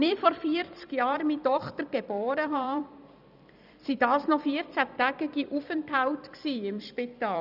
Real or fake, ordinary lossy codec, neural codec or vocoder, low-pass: fake; none; vocoder, 44.1 kHz, 128 mel bands every 256 samples, BigVGAN v2; 5.4 kHz